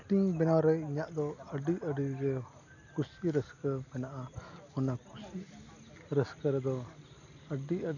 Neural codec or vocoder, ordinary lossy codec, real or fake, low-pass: none; none; real; 7.2 kHz